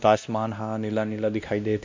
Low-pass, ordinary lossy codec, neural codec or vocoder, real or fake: 7.2 kHz; MP3, 64 kbps; codec, 16 kHz, 1 kbps, X-Codec, WavLM features, trained on Multilingual LibriSpeech; fake